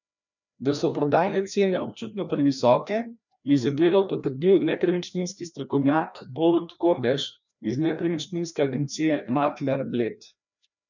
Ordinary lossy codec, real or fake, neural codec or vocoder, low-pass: none; fake; codec, 16 kHz, 1 kbps, FreqCodec, larger model; 7.2 kHz